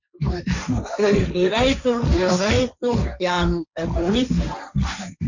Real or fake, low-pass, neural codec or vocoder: fake; 7.2 kHz; codec, 16 kHz, 1.1 kbps, Voila-Tokenizer